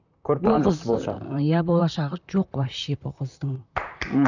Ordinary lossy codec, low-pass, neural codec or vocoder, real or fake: none; 7.2 kHz; vocoder, 44.1 kHz, 128 mel bands, Pupu-Vocoder; fake